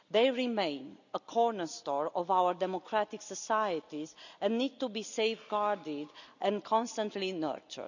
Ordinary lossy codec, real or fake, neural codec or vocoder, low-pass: none; real; none; 7.2 kHz